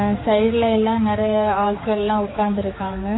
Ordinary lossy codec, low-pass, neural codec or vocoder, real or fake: AAC, 16 kbps; 7.2 kHz; codec, 16 kHz, 4 kbps, X-Codec, HuBERT features, trained on general audio; fake